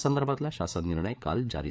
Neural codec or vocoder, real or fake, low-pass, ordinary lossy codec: codec, 16 kHz, 4 kbps, FreqCodec, larger model; fake; none; none